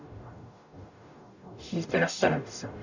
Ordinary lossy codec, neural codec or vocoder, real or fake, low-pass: none; codec, 44.1 kHz, 0.9 kbps, DAC; fake; 7.2 kHz